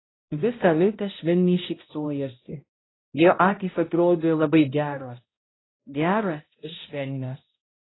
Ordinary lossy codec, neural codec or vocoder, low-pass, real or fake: AAC, 16 kbps; codec, 16 kHz, 0.5 kbps, X-Codec, HuBERT features, trained on balanced general audio; 7.2 kHz; fake